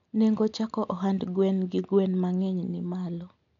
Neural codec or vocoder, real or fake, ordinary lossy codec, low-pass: none; real; none; 7.2 kHz